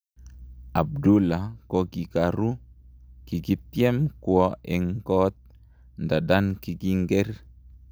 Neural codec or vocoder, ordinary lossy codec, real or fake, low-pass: none; none; real; none